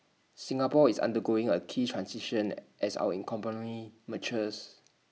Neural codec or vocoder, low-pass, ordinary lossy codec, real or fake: none; none; none; real